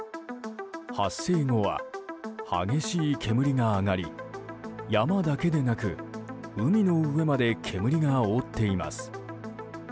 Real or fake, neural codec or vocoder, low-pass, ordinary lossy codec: real; none; none; none